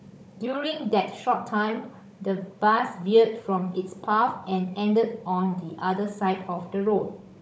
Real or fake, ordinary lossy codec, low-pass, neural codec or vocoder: fake; none; none; codec, 16 kHz, 16 kbps, FunCodec, trained on Chinese and English, 50 frames a second